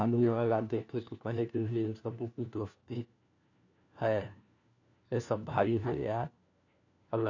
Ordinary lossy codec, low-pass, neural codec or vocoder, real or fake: none; 7.2 kHz; codec, 16 kHz, 1 kbps, FunCodec, trained on LibriTTS, 50 frames a second; fake